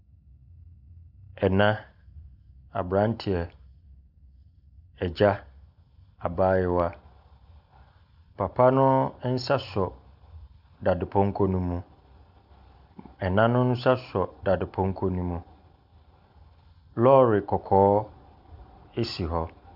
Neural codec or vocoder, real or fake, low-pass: none; real; 5.4 kHz